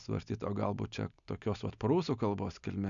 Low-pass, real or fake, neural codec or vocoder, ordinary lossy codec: 7.2 kHz; real; none; MP3, 96 kbps